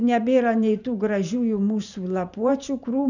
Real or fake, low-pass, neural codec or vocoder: fake; 7.2 kHz; vocoder, 44.1 kHz, 128 mel bands every 512 samples, BigVGAN v2